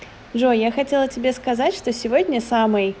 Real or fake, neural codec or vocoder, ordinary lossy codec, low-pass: real; none; none; none